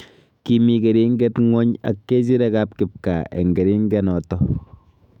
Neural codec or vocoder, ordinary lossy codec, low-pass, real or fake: autoencoder, 48 kHz, 128 numbers a frame, DAC-VAE, trained on Japanese speech; none; 19.8 kHz; fake